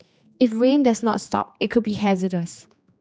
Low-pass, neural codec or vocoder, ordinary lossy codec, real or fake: none; codec, 16 kHz, 2 kbps, X-Codec, HuBERT features, trained on general audio; none; fake